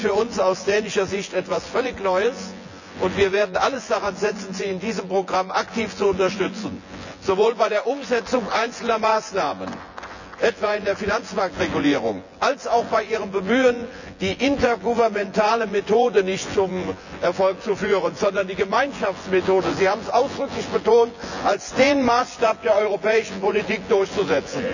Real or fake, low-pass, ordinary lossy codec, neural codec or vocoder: fake; 7.2 kHz; none; vocoder, 24 kHz, 100 mel bands, Vocos